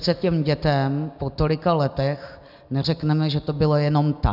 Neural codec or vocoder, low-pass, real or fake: none; 5.4 kHz; real